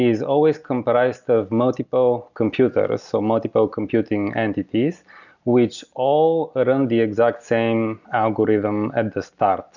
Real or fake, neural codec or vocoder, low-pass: real; none; 7.2 kHz